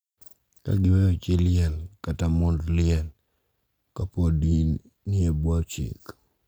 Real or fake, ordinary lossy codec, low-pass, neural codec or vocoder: real; none; none; none